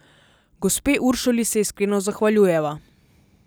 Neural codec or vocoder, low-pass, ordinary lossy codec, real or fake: none; none; none; real